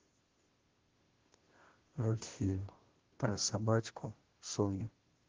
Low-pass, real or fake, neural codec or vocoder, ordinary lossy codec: 7.2 kHz; fake; codec, 16 kHz, 0.5 kbps, FunCodec, trained on Chinese and English, 25 frames a second; Opus, 16 kbps